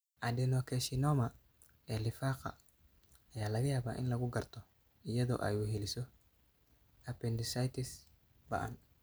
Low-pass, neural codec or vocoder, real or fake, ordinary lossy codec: none; none; real; none